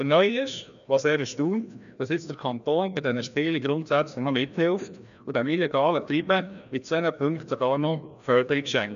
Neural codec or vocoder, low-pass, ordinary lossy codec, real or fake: codec, 16 kHz, 1 kbps, FreqCodec, larger model; 7.2 kHz; none; fake